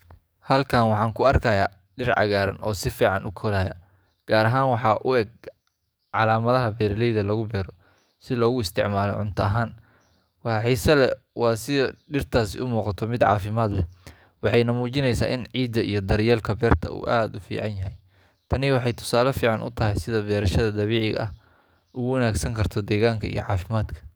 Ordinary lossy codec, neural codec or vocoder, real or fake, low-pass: none; codec, 44.1 kHz, 7.8 kbps, DAC; fake; none